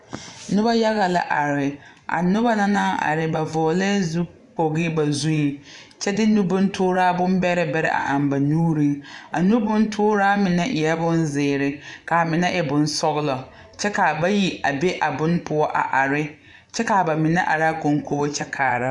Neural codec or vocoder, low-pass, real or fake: vocoder, 24 kHz, 100 mel bands, Vocos; 10.8 kHz; fake